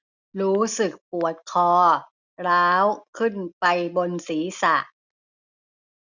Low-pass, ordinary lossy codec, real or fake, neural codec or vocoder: 7.2 kHz; none; real; none